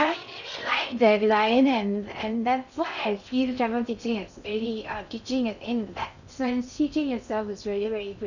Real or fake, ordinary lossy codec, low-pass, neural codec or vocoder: fake; none; 7.2 kHz; codec, 16 kHz in and 24 kHz out, 0.6 kbps, FocalCodec, streaming, 4096 codes